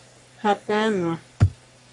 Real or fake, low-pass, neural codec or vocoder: fake; 10.8 kHz; codec, 44.1 kHz, 3.4 kbps, Pupu-Codec